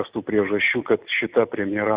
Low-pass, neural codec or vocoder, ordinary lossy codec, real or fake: 3.6 kHz; vocoder, 44.1 kHz, 128 mel bands every 256 samples, BigVGAN v2; Opus, 64 kbps; fake